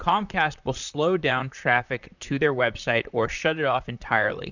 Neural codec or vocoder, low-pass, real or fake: vocoder, 44.1 kHz, 128 mel bands, Pupu-Vocoder; 7.2 kHz; fake